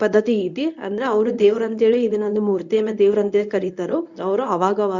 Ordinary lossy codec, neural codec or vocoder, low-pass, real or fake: none; codec, 24 kHz, 0.9 kbps, WavTokenizer, medium speech release version 1; 7.2 kHz; fake